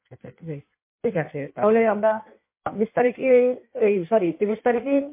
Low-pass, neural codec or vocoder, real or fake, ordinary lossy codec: 3.6 kHz; codec, 16 kHz in and 24 kHz out, 1.1 kbps, FireRedTTS-2 codec; fake; MP3, 24 kbps